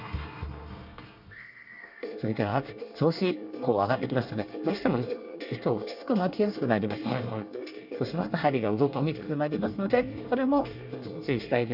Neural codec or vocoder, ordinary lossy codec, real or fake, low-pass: codec, 24 kHz, 1 kbps, SNAC; none; fake; 5.4 kHz